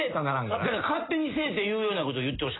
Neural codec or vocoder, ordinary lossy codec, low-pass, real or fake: none; AAC, 16 kbps; 7.2 kHz; real